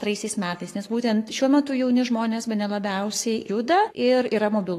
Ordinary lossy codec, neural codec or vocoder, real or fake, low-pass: AAC, 48 kbps; codec, 44.1 kHz, 7.8 kbps, Pupu-Codec; fake; 14.4 kHz